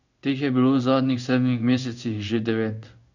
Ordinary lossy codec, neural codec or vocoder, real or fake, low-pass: none; codec, 16 kHz in and 24 kHz out, 1 kbps, XY-Tokenizer; fake; 7.2 kHz